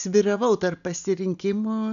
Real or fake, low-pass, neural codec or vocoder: real; 7.2 kHz; none